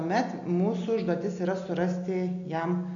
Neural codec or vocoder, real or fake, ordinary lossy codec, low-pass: none; real; MP3, 64 kbps; 7.2 kHz